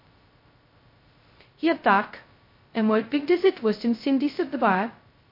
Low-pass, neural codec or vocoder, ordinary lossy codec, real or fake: 5.4 kHz; codec, 16 kHz, 0.2 kbps, FocalCodec; MP3, 32 kbps; fake